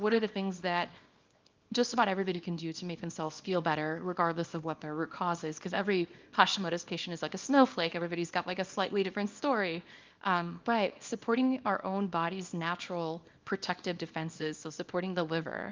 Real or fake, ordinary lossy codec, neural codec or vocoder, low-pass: fake; Opus, 32 kbps; codec, 24 kHz, 0.9 kbps, WavTokenizer, small release; 7.2 kHz